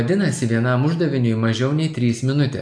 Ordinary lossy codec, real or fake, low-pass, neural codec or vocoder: AAC, 64 kbps; real; 9.9 kHz; none